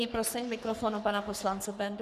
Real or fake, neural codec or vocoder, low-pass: fake; codec, 44.1 kHz, 3.4 kbps, Pupu-Codec; 14.4 kHz